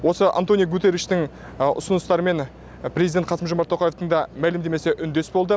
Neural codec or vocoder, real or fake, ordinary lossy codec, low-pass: none; real; none; none